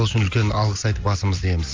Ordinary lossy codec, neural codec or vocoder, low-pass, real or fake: Opus, 32 kbps; none; 7.2 kHz; real